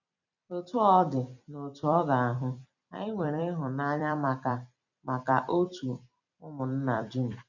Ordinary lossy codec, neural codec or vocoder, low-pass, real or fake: none; vocoder, 44.1 kHz, 128 mel bands every 256 samples, BigVGAN v2; 7.2 kHz; fake